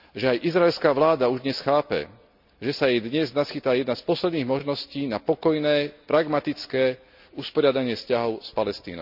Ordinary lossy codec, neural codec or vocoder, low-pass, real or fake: none; none; 5.4 kHz; real